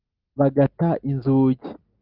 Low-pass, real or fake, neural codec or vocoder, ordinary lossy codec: 5.4 kHz; real; none; Opus, 24 kbps